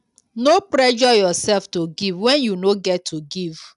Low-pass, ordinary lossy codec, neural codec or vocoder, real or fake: 10.8 kHz; none; none; real